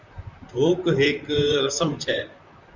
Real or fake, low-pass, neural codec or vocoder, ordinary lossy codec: fake; 7.2 kHz; vocoder, 44.1 kHz, 128 mel bands, Pupu-Vocoder; Opus, 64 kbps